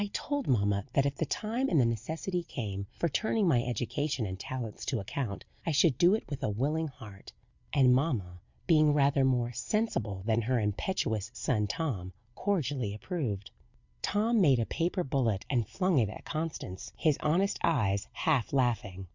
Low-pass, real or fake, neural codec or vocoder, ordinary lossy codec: 7.2 kHz; real; none; Opus, 64 kbps